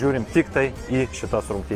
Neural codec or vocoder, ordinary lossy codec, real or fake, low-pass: none; Opus, 32 kbps; real; 14.4 kHz